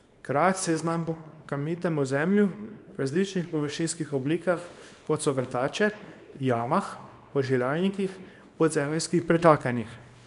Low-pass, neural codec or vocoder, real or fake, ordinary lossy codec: 10.8 kHz; codec, 24 kHz, 0.9 kbps, WavTokenizer, small release; fake; none